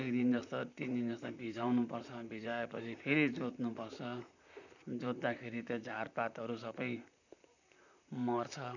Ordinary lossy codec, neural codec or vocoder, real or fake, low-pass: none; vocoder, 44.1 kHz, 128 mel bands, Pupu-Vocoder; fake; 7.2 kHz